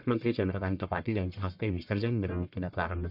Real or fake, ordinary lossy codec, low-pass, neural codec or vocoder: fake; none; 5.4 kHz; codec, 44.1 kHz, 1.7 kbps, Pupu-Codec